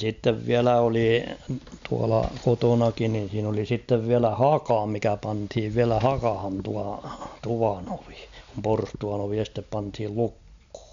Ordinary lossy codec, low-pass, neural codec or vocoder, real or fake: MP3, 64 kbps; 7.2 kHz; none; real